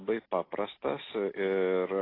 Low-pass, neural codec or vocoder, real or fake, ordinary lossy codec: 5.4 kHz; none; real; AAC, 24 kbps